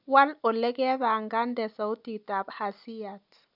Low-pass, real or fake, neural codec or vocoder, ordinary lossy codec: 5.4 kHz; real; none; none